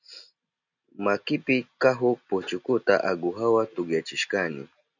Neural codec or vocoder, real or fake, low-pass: none; real; 7.2 kHz